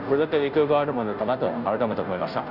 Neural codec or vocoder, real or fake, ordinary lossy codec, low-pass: codec, 16 kHz, 0.5 kbps, FunCodec, trained on Chinese and English, 25 frames a second; fake; none; 5.4 kHz